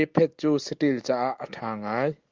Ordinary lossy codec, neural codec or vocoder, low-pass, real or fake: Opus, 24 kbps; none; 7.2 kHz; real